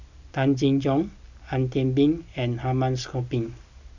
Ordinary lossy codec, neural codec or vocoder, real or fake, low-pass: none; none; real; 7.2 kHz